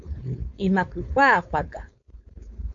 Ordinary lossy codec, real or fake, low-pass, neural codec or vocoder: MP3, 48 kbps; fake; 7.2 kHz; codec, 16 kHz, 4.8 kbps, FACodec